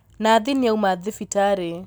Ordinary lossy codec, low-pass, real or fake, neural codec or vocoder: none; none; real; none